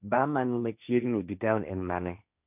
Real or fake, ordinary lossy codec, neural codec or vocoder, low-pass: fake; none; codec, 16 kHz, 1.1 kbps, Voila-Tokenizer; 3.6 kHz